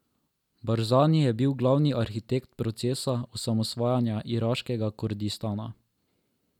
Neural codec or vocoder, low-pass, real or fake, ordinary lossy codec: none; 19.8 kHz; real; none